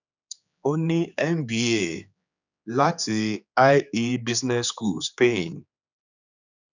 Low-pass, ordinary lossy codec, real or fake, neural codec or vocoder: 7.2 kHz; none; fake; codec, 16 kHz, 4 kbps, X-Codec, HuBERT features, trained on general audio